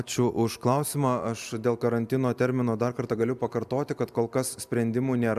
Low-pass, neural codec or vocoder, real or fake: 14.4 kHz; none; real